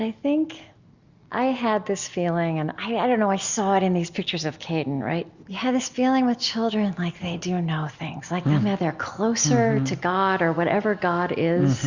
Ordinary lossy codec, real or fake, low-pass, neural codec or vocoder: Opus, 64 kbps; real; 7.2 kHz; none